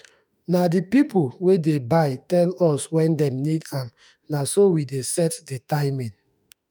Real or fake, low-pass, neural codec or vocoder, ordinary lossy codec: fake; none; autoencoder, 48 kHz, 32 numbers a frame, DAC-VAE, trained on Japanese speech; none